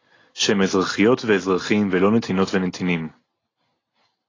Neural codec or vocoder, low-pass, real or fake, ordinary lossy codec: none; 7.2 kHz; real; AAC, 32 kbps